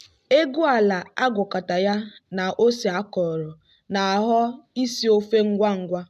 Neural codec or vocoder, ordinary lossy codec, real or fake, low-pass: none; none; real; 14.4 kHz